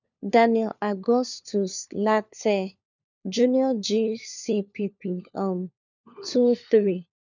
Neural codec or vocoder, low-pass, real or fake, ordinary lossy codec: codec, 16 kHz, 4 kbps, FunCodec, trained on LibriTTS, 50 frames a second; 7.2 kHz; fake; none